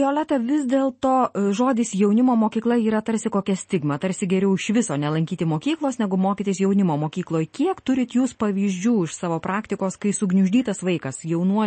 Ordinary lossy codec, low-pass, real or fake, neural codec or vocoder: MP3, 32 kbps; 10.8 kHz; real; none